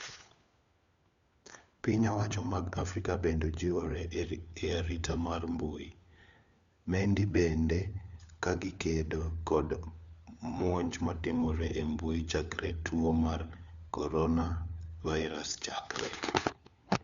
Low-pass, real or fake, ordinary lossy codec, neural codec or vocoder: 7.2 kHz; fake; Opus, 64 kbps; codec, 16 kHz, 4 kbps, FunCodec, trained on LibriTTS, 50 frames a second